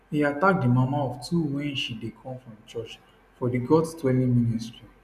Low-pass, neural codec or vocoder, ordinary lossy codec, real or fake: 14.4 kHz; none; none; real